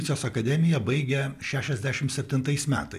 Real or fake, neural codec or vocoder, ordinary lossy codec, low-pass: real; none; MP3, 96 kbps; 14.4 kHz